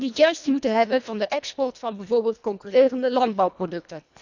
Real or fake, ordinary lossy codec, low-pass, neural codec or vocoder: fake; none; 7.2 kHz; codec, 24 kHz, 1.5 kbps, HILCodec